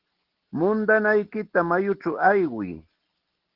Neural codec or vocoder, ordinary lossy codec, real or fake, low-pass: none; Opus, 16 kbps; real; 5.4 kHz